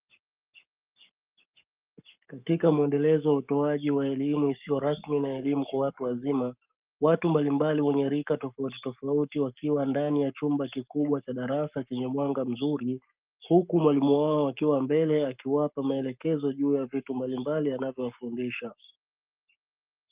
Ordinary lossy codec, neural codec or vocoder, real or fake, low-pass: Opus, 24 kbps; codec, 44.1 kHz, 7.8 kbps, Pupu-Codec; fake; 3.6 kHz